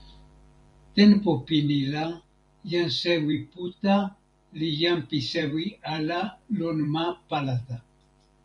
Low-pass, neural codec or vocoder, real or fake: 10.8 kHz; vocoder, 44.1 kHz, 128 mel bands every 512 samples, BigVGAN v2; fake